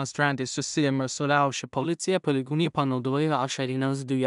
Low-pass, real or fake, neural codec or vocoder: 10.8 kHz; fake; codec, 16 kHz in and 24 kHz out, 0.4 kbps, LongCat-Audio-Codec, two codebook decoder